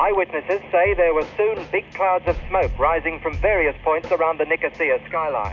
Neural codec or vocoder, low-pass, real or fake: none; 7.2 kHz; real